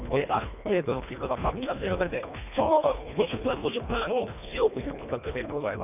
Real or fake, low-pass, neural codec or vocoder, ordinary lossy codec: fake; 3.6 kHz; codec, 24 kHz, 1.5 kbps, HILCodec; none